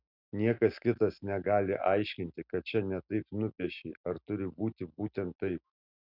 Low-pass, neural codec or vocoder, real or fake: 5.4 kHz; none; real